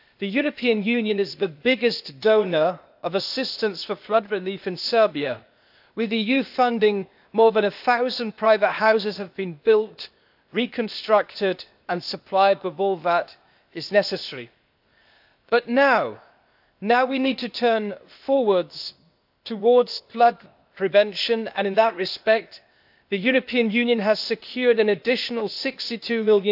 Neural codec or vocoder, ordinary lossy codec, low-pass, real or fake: codec, 16 kHz, 0.8 kbps, ZipCodec; AAC, 48 kbps; 5.4 kHz; fake